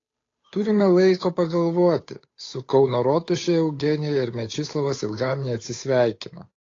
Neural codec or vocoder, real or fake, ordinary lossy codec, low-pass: codec, 16 kHz, 8 kbps, FunCodec, trained on Chinese and English, 25 frames a second; fake; AAC, 32 kbps; 7.2 kHz